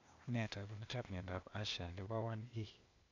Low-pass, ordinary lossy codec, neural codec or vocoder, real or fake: 7.2 kHz; AAC, 48 kbps; codec, 16 kHz, 0.8 kbps, ZipCodec; fake